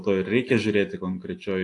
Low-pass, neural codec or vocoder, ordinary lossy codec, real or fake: 10.8 kHz; none; AAC, 48 kbps; real